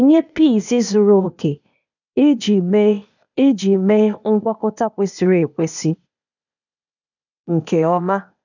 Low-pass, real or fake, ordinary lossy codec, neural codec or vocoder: 7.2 kHz; fake; none; codec, 16 kHz, 0.8 kbps, ZipCodec